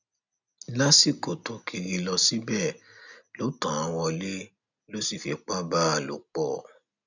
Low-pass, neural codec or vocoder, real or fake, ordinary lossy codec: 7.2 kHz; none; real; none